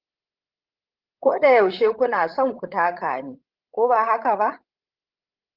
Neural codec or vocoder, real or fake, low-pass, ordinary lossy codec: codec, 16 kHz, 16 kbps, FreqCodec, larger model; fake; 5.4 kHz; Opus, 16 kbps